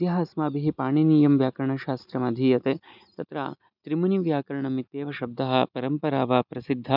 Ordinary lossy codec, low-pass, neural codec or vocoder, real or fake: none; 5.4 kHz; none; real